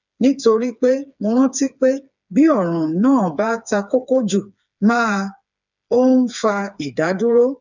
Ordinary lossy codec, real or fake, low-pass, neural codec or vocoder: none; fake; 7.2 kHz; codec, 16 kHz, 4 kbps, FreqCodec, smaller model